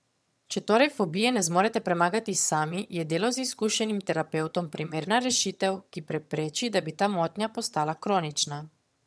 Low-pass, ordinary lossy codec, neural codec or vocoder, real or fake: none; none; vocoder, 22.05 kHz, 80 mel bands, HiFi-GAN; fake